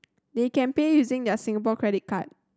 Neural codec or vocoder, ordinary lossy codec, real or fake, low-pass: none; none; real; none